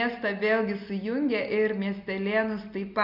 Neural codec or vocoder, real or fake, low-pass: none; real; 5.4 kHz